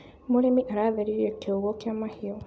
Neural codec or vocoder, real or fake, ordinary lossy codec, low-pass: none; real; none; none